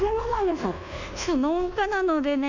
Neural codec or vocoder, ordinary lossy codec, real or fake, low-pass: codec, 24 kHz, 1.2 kbps, DualCodec; none; fake; 7.2 kHz